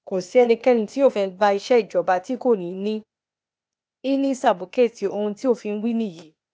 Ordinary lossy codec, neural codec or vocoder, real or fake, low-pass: none; codec, 16 kHz, 0.8 kbps, ZipCodec; fake; none